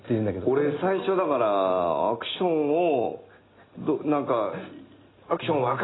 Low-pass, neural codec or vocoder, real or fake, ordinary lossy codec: 7.2 kHz; none; real; AAC, 16 kbps